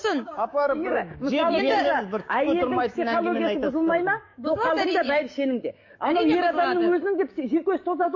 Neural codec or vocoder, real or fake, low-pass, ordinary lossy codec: codec, 16 kHz, 6 kbps, DAC; fake; 7.2 kHz; MP3, 32 kbps